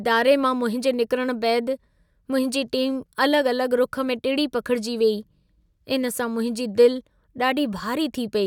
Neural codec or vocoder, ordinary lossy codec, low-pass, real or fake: none; none; 19.8 kHz; real